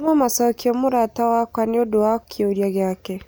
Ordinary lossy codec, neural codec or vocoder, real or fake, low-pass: none; none; real; none